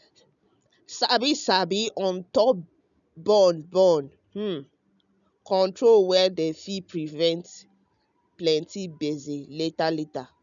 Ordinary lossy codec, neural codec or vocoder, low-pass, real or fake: none; none; 7.2 kHz; real